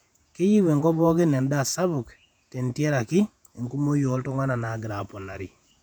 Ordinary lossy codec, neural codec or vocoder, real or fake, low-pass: none; vocoder, 48 kHz, 128 mel bands, Vocos; fake; 19.8 kHz